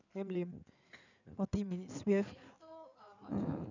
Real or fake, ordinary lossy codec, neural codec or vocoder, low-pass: fake; none; codec, 16 kHz in and 24 kHz out, 2.2 kbps, FireRedTTS-2 codec; 7.2 kHz